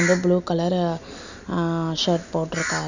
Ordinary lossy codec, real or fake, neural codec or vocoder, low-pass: none; real; none; 7.2 kHz